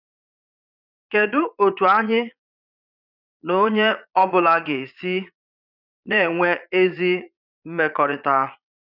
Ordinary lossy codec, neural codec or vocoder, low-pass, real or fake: none; vocoder, 22.05 kHz, 80 mel bands, Vocos; 5.4 kHz; fake